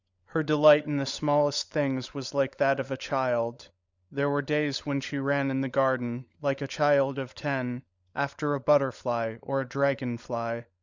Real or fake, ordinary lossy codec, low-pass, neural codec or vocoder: fake; Opus, 64 kbps; 7.2 kHz; codec, 16 kHz, 16 kbps, FunCodec, trained on LibriTTS, 50 frames a second